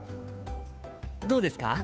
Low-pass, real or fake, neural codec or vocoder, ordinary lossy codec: none; fake; codec, 16 kHz, 2 kbps, FunCodec, trained on Chinese and English, 25 frames a second; none